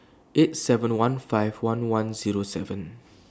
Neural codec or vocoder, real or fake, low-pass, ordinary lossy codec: none; real; none; none